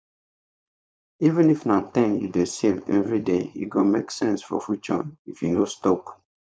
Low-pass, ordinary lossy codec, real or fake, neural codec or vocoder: none; none; fake; codec, 16 kHz, 4.8 kbps, FACodec